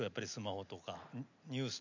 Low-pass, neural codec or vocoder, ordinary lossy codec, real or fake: 7.2 kHz; none; none; real